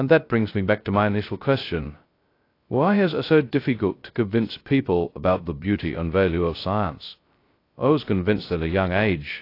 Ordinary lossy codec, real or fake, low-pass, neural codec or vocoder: AAC, 32 kbps; fake; 5.4 kHz; codec, 16 kHz, 0.2 kbps, FocalCodec